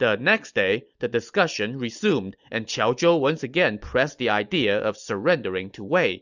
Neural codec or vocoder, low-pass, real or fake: none; 7.2 kHz; real